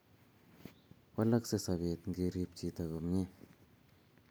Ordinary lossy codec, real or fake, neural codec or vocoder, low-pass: none; real; none; none